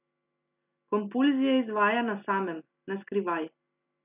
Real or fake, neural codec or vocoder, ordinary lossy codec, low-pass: real; none; none; 3.6 kHz